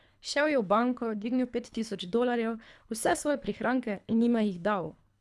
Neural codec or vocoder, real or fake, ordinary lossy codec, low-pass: codec, 24 kHz, 3 kbps, HILCodec; fake; none; none